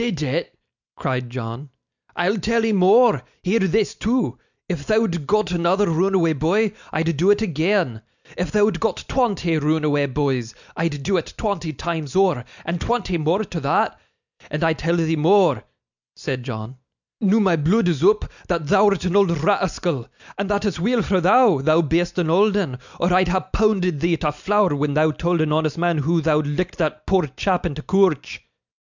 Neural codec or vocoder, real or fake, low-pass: none; real; 7.2 kHz